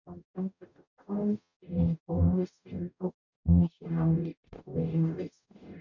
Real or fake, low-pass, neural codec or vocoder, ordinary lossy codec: fake; 7.2 kHz; codec, 44.1 kHz, 0.9 kbps, DAC; none